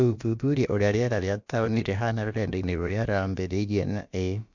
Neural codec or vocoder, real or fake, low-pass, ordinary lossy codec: codec, 16 kHz, about 1 kbps, DyCAST, with the encoder's durations; fake; 7.2 kHz; Opus, 64 kbps